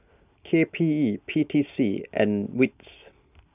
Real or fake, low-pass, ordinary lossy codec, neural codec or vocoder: real; 3.6 kHz; none; none